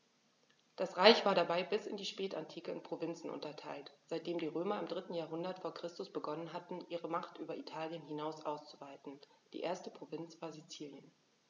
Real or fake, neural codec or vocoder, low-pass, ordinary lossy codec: real; none; 7.2 kHz; none